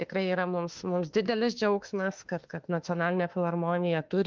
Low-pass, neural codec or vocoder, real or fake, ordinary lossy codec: 7.2 kHz; autoencoder, 48 kHz, 32 numbers a frame, DAC-VAE, trained on Japanese speech; fake; Opus, 24 kbps